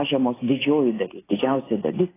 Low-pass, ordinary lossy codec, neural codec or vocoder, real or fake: 3.6 kHz; AAC, 16 kbps; none; real